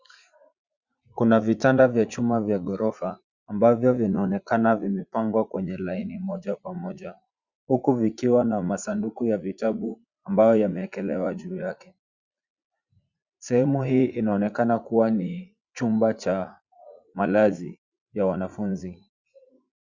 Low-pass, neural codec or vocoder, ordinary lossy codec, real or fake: 7.2 kHz; vocoder, 44.1 kHz, 80 mel bands, Vocos; Opus, 64 kbps; fake